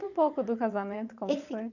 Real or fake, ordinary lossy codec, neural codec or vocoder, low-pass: fake; none; vocoder, 22.05 kHz, 80 mel bands, Vocos; 7.2 kHz